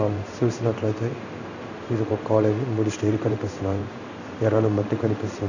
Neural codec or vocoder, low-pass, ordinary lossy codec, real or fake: codec, 16 kHz in and 24 kHz out, 1 kbps, XY-Tokenizer; 7.2 kHz; none; fake